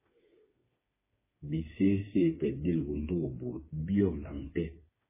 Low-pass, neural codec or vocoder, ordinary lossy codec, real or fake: 3.6 kHz; codec, 16 kHz, 4 kbps, FreqCodec, smaller model; MP3, 16 kbps; fake